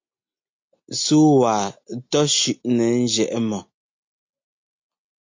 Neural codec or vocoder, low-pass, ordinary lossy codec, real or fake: none; 7.2 kHz; MP3, 48 kbps; real